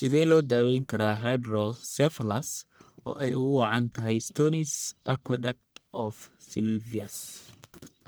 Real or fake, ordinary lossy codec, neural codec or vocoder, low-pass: fake; none; codec, 44.1 kHz, 1.7 kbps, Pupu-Codec; none